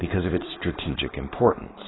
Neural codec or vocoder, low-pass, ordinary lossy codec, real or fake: codec, 16 kHz, 4.8 kbps, FACodec; 7.2 kHz; AAC, 16 kbps; fake